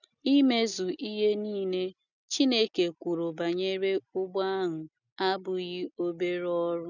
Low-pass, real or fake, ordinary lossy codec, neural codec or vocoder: 7.2 kHz; real; none; none